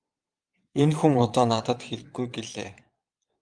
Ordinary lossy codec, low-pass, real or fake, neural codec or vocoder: Opus, 32 kbps; 9.9 kHz; fake; vocoder, 22.05 kHz, 80 mel bands, Vocos